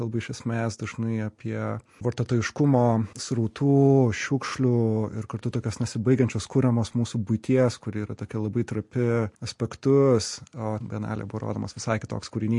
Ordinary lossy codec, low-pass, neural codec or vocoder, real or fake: MP3, 48 kbps; 10.8 kHz; none; real